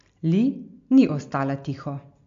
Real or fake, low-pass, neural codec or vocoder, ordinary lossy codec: real; 7.2 kHz; none; MP3, 48 kbps